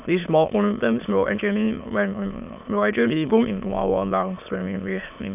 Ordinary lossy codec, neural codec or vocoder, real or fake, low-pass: none; autoencoder, 22.05 kHz, a latent of 192 numbers a frame, VITS, trained on many speakers; fake; 3.6 kHz